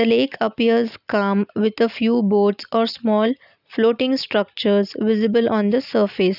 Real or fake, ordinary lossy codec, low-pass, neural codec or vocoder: real; none; 5.4 kHz; none